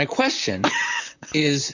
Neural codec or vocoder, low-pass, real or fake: vocoder, 44.1 kHz, 128 mel bands, Pupu-Vocoder; 7.2 kHz; fake